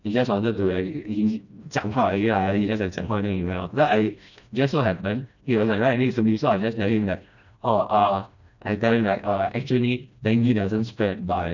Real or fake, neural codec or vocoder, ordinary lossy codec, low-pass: fake; codec, 16 kHz, 1 kbps, FreqCodec, smaller model; none; 7.2 kHz